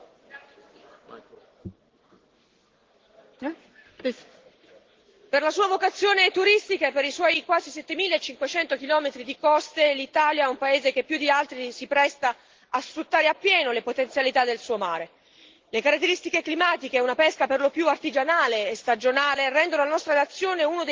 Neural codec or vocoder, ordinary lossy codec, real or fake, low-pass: none; Opus, 16 kbps; real; 7.2 kHz